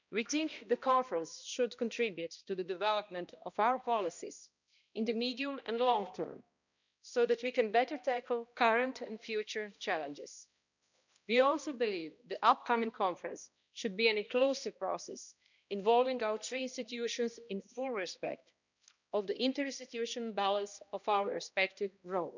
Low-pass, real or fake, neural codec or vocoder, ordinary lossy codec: 7.2 kHz; fake; codec, 16 kHz, 1 kbps, X-Codec, HuBERT features, trained on balanced general audio; none